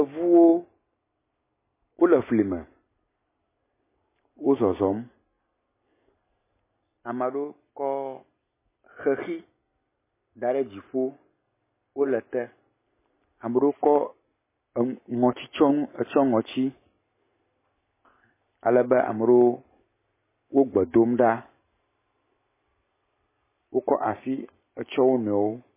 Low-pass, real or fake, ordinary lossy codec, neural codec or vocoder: 3.6 kHz; real; MP3, 16 kbps; none